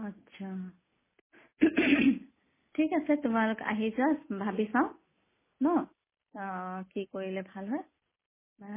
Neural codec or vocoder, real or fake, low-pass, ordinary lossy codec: none; real; 3.6 kHz; MP3, 16 kbps